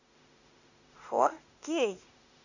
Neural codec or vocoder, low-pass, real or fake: autoencoder, 48 kHz, 32 numbers a frame, DAC-VAE, trained on Japanese speech; 7.2 kHz; fake